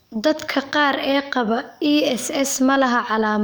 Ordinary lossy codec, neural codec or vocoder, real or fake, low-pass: none; codec, 44.1 kHz, 7.8 kbps, DAC; fake; none